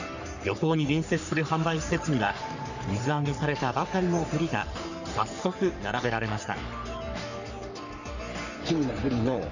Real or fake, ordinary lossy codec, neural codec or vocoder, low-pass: fake; none; codec, 44.1 kHz, 3.4 kbps, Pupu-Codec; 7.2 kHz